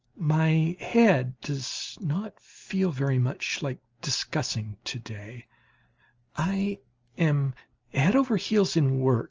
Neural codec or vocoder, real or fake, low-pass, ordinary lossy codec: none; real; 7.2 kHz; Opus, 24 kbps